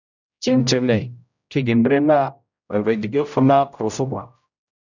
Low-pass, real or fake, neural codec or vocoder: 7.2 kHz; fake; codec, 16 kHz, 0.5 kbps, X-Codec, HuBERT features, trained on general audio